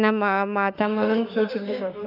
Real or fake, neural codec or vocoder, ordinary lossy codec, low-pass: fake; autoencoder, 48 kHz, 32 numbers a frame, DAC-VAE, trained on Japanese speech; none; 5.4 kHz